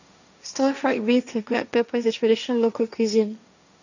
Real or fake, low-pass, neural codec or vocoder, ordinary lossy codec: fake; 7.2 kHz; codec, 16 kHz, 1.1 kbps, Voila-Tokenizer; none